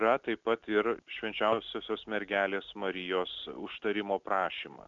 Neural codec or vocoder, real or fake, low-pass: none; real; 7.2 kHz